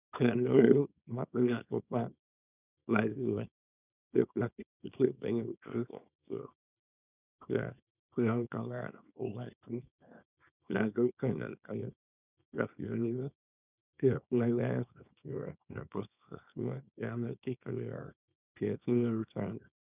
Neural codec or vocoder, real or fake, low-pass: codec, 24 kHz, 0.9 kbps, WavTokenizer, small release; fake; 3.6 kHz